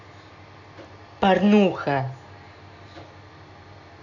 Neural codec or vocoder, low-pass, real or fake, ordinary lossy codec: none; 7.2 kHz; real; none